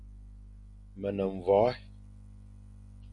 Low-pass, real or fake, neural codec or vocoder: 10.8 kHz; real; none